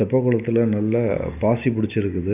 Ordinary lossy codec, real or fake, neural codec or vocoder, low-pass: none; real; none; 3.6 kHz